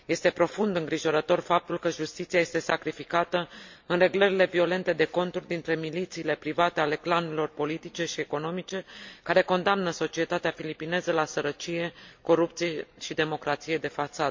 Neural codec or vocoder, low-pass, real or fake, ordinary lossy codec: none; 7.2 kHz; real; MP3, 48 kbps